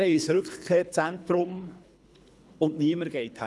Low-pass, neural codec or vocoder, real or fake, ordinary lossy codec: none; codec, 24 kHz, 3 kbps, HILCodec; fake; none